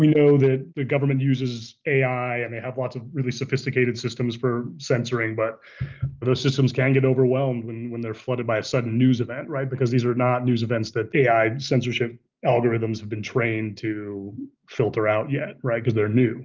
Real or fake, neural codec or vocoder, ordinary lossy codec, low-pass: real; none; Opus, 24 kbps; 7.2 kHz